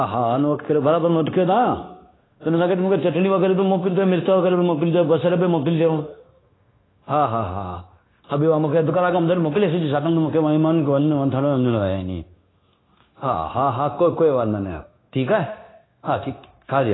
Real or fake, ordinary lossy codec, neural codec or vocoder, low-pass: fake; AAC, 16 kbps; codec, 16 kHz, 0.9 kbps, LongCat-Audio-Codec; 7.2 kHz